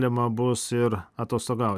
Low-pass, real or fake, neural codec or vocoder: 14.4 kHz; real; none